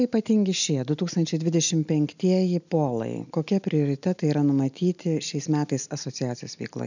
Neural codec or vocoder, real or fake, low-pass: none; real; 7.2 kHz